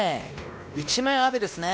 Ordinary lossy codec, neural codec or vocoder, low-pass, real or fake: none; codec, 16 kHz, 1 kbps, X-Codec, WavLM features, trained on Multilingual LibriSpeech; none; fake